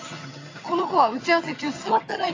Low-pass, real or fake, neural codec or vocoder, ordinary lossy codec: 7.2 kHz; fake; vocoder, 22.05 kHz, 80 mel bands, HiFi-GAN; AAC, 32 kbps